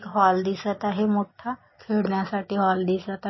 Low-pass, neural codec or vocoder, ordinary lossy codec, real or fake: 7.2 kHz; none; MP3, 24 kbps; real